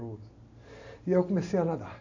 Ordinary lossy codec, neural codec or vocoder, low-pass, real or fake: AAC, 48 kbps; none; 7.2 kHz; real